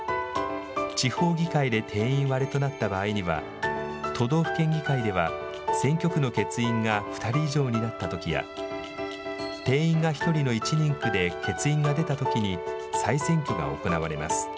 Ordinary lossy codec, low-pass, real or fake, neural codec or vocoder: none; none; real; none